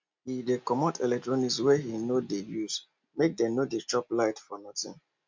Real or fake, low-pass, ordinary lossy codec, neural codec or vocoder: real; 7.2 kHz; MP3, 64 kbps; none